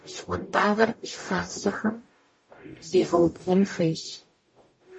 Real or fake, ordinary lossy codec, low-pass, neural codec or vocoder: fake; MP3, 32 kbps; 9.9 kHz; codec, 44.1 kHz, 0.9 kbps, DAC